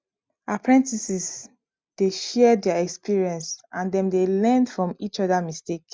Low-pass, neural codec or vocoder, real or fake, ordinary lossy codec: none; none; real; none